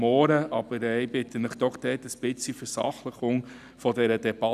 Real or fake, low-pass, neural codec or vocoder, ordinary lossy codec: real; 14.4 kHz; none; none